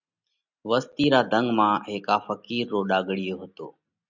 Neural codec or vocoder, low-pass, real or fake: none; 7.2 kHz; real